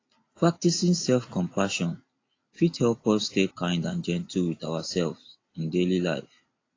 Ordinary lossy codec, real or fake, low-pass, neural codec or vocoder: AAC, 32 kbps; real; 7.2 kHz; none